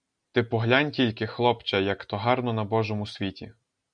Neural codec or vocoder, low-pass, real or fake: none; 9.9 kHz; real